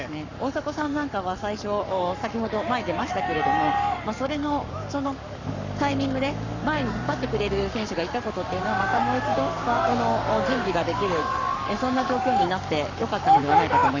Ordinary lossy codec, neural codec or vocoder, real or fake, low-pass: none; codec, 44.1 kHz, 7.8 kbps, Pupu-Codec; fake; 7.2 kHz